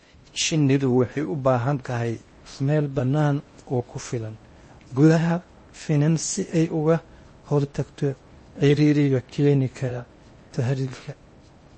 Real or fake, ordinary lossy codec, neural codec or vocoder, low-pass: fake; MP3, 32 kbps; codec, 16 kHz in and 24 kHz out, 0.6 kbps, FocalCodec, streaming, 4096 codes; 9.9 kHz